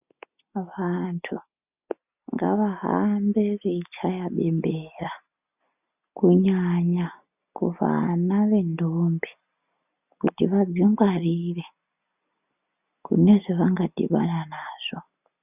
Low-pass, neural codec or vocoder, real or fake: 3.6 kHz; none; real